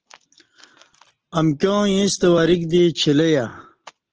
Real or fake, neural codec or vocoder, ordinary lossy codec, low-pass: real; none; Opus, 16 kbps; 7.2 kHz